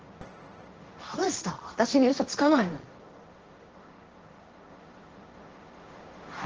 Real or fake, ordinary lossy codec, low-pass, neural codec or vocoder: fake; Opus, 16 kbps; 7.2 kHz; codec, 16 kHz, 1.1 kbps, Voila-Tokenizer